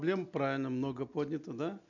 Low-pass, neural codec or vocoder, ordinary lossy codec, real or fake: 7.2 kHz; none; none; real